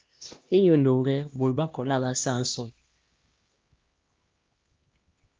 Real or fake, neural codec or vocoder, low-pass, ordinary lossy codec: fake; codec, 16 kHz, 1 kbps, X-Codec, HuBERT features, trained on LibriSpeech; 7.2 kHz; Opus, 32 kbps